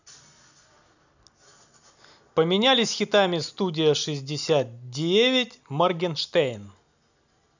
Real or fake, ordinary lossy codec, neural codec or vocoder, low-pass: real; none; none; 7.2 kHz